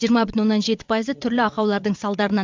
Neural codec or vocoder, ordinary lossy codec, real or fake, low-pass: vocoder, 22.05 kHz, 80 mel bands, WaveNeXt; MP3, 64 kbps; fake; 7.2 kHz